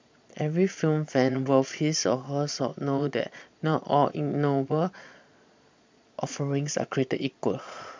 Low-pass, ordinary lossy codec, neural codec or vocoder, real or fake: 7.2 kHz; MP3, 64 kbps; vocoder, 22.05 kHz, 80 mel bands, Vocos; fake